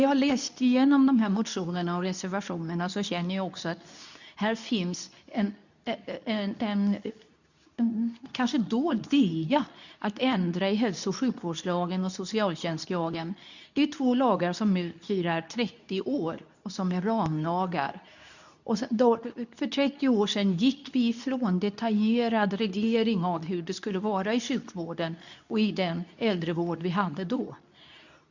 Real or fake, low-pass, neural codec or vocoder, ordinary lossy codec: fake; 7.2 kHz; codec, 24 kHz, 0.9 kbps, WavTokenizer, medium speech release version 2; none